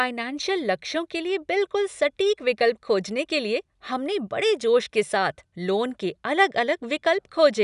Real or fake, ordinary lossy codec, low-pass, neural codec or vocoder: real; none; 10.8 kHz; none